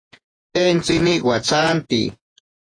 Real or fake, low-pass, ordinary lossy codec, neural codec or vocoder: fake; 9.9 kHz; AAC, 32 kbps; vocoder, 48 kHz, 128 mel bands, Vocos